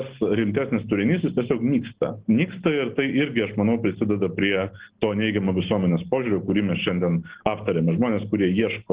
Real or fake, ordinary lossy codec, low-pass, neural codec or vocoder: real; Opus, 16 kbps; 3.6 kHz; none